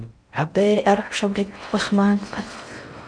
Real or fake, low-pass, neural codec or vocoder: fake; 9.9 kHz; codec, 16 kHz in and 24 kHz out, 0.6 kbps, FocalCodec, streaming, 4096 codes